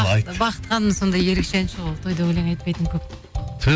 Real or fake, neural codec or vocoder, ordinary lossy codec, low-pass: real; none; none; none